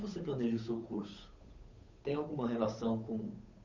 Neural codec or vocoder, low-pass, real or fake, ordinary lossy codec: codec, 16 kHz, 8 kbps, FunCodec, trained on Chinese and English, 25 frames a second; 7.2 kHz; fake; none